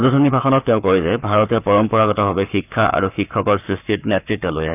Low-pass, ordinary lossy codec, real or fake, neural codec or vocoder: 3.6 kHz; none; fake; codec, 44.1 kHz, 7.8 kbps, Pupu-Codec